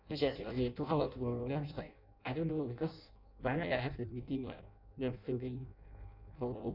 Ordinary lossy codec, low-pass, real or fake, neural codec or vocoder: none; 5.4 kHz; fake; codec, 16 kHz in and 24 kHz out, 0.6 kbps, FireRedTTS-2 codec